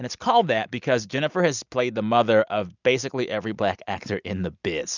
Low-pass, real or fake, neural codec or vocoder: 7.2 kHz; real; none